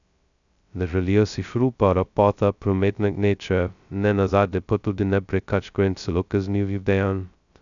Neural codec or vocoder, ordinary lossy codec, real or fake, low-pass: codec, 16 kHz, 0.2 kbps, FocalCodec; none; fake; 7.2 kHz